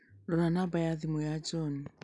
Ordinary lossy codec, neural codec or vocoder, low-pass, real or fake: none; none; 10.8 kHz; real